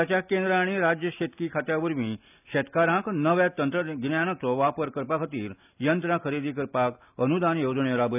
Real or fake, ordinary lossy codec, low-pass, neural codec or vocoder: real; none; 3.6 kHz; none